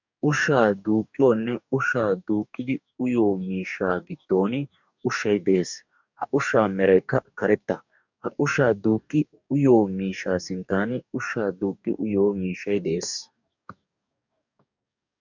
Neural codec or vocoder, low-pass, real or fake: codec, 44.1 kHz, 2.6 kbps, DAC; 7.2 kHz; fake